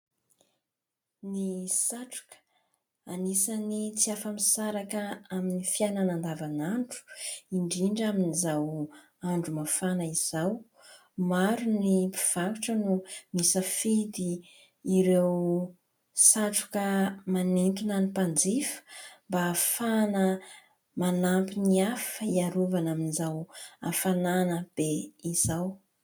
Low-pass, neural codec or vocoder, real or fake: 19.8 kHz; none; real